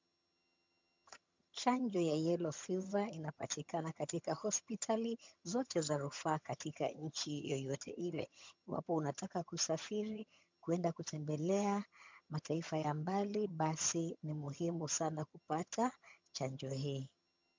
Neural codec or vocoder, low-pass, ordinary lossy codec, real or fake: vocoder, 22.05 kHz, 80 mel bands, HiFi-GAN; 7.2 kHz; MP3, 64 kbps; fake